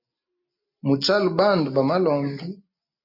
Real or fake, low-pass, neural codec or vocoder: real; 5.4 kHz; none